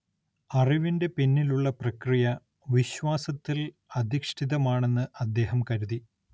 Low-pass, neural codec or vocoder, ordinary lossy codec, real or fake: none; none; none; real